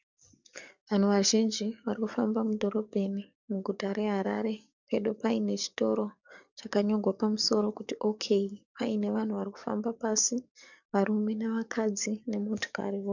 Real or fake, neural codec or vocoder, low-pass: fake; codec, 44.1 kHz, 7.8 kbps, DAC; 7.2 kHz